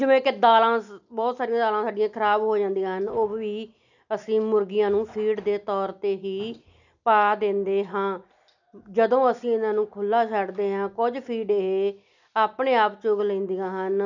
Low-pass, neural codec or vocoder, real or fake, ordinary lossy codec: 7.2 kHz; none; real; none